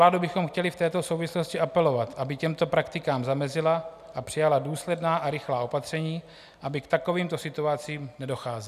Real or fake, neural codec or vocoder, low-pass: real; none; 14.4 kHz